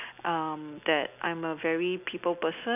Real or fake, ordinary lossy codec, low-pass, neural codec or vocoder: real; none; 3.6 kHz; none